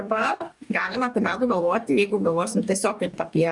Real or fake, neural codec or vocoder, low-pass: fake; codec, 44.1 kHz, 2.6 kbps, DAC; 10.8 kHz